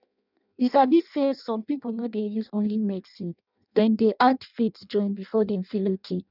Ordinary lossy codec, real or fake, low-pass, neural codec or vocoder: none; fake; 5.4 kHz; codec, 16 kHz in and 24 kHz out, 0.6 kbps, FireRedTTS-2 codec